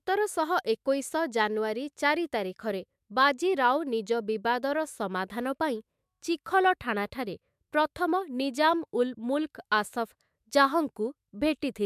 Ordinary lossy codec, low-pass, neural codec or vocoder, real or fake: MP3, 96 kbps; 14.4 kHz; autoencoder, 48 kHz, 128 numbers a frame, DAC-VAE, trained on Japanese speech; fake